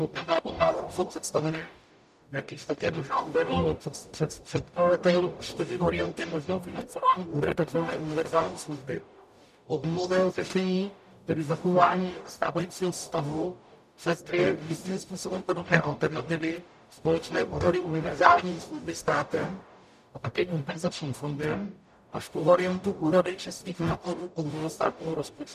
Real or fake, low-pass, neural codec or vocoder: fake; 14.4 kHz; codec, 44.1 kHz, 0.9 kbps, DAC